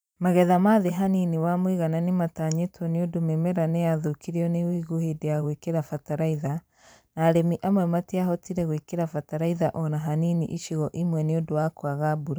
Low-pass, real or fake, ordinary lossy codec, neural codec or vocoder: none; fake; none; vocoder, 44.1 kHz, 128 mel bands every 512 samples, BigVGAN v2